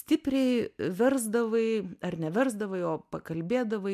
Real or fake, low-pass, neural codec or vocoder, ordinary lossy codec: real; 14.4 kHz; none; MP3, 96 kbps